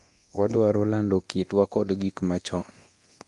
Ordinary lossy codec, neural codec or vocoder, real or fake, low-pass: Opus, 32 kbps; codec, 24 kHz, 0.9 kbps, DualCodec; fake; 10.8 kHz